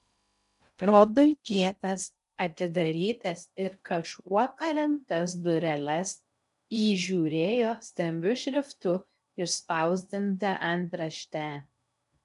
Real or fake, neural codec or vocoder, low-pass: fake; codec, 16 kHz in and 24 kHz out, 0.6 kbps, FocalCodec, streaming, 2048 codes; 10.8 kHz